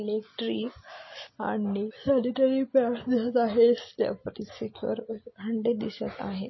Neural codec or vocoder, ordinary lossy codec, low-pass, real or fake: none; MP3, 24 kbps; 7.2 kHz; real